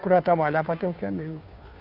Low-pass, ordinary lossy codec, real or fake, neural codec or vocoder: 5.4 kHz; AAC, 48 kbps; fake; codec, 16 kHz, 2 kbps, FunCodec, trained on Chinese and English, 25 frames a second